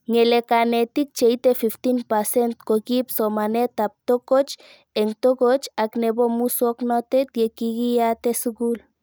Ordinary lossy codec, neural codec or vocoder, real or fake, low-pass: none; none; real; none